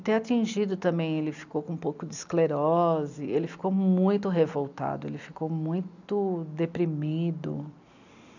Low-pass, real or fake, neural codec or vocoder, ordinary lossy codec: 7.2 kHz; real; none; none